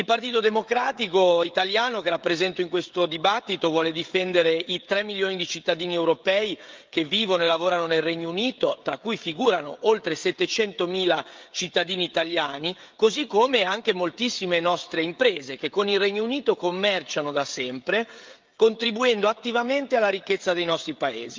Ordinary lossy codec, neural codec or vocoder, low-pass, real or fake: Opus, 24 kbps; codec, 16 kHz, 16 kbps, FreqCodec, smaller model; 7.2 kHz; fake